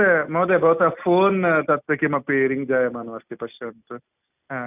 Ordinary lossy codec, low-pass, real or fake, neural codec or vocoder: none; 3.6 kHz; real; none